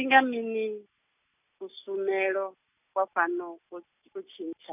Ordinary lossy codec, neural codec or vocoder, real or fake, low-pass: none; none; real; 3.6 kHz